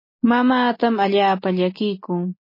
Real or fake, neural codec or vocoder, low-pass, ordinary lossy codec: real; none; 5.4 kHz; MP3, 24 kbps